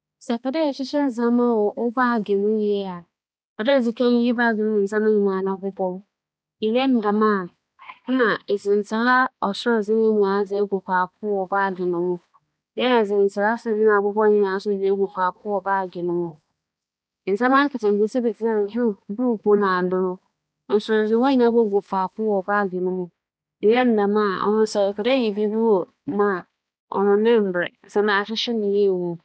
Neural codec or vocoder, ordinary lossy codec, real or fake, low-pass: codec, 16 kHz, 2 kbps, X-Codec, HuBERT features, trained on balanced general audio; none; fake; none